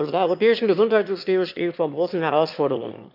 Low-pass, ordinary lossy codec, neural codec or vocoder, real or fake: 5.4 kHz; none; autoencoder, 22.05 kHz, a latent of 192 numbers a frame, VITS, trained on one speaker; fake